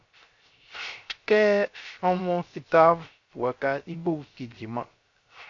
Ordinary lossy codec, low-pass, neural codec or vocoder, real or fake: AAC, 48 kbps; 7.2 kHz; codec, 16 kHz, 0.3 kbps, FocalCodec; fake